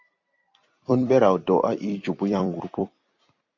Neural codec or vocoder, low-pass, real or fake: vocoder, 44.1 kHz, 128 mel bands every 256 samples, BigVGAN v2; 7.2 kHz; fake